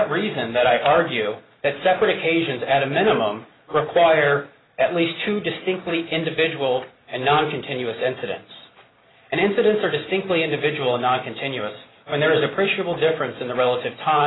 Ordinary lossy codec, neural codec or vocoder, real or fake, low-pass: AAC, 16 kbps; none; real; 7.2 kHz